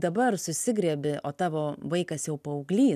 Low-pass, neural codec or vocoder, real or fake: 14.4 kHz; none; real